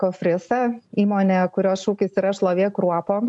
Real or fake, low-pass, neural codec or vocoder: real; 10.8 kHz; none